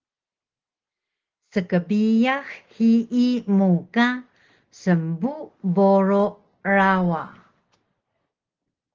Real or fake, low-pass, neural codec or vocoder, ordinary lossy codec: real; 7.2 kHz; none; Opus, 16 kbps